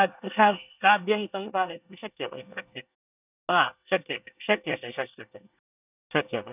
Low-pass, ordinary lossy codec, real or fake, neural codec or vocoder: 3.6 kHz; none; fake; codec, 24 kHz, 1 kbps, SNAC